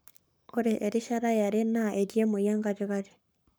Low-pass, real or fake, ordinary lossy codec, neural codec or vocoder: none; fake; none; codec, 44.1 kHz, 7.8 kbps, Pupu-Codec